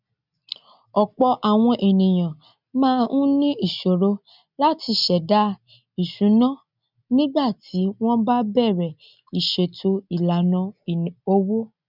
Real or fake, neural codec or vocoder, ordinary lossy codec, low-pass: real; none; none; 5.4 kHz